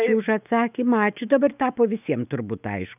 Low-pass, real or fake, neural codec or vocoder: 3.6 kHz; fake; autoencoder, 48 kHz, 128 numbers a frame, DAC-VAE, trained on Japanese speech